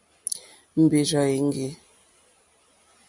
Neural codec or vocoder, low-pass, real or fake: none; 10.8 kHz; real